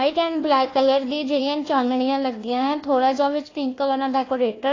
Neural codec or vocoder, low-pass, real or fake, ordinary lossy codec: codec, 16 kHz, 1 kbps, FunCodec, trained on Chinese and English, 50 frames a second; 7.2 kHz; fake; AAC, 32 kbps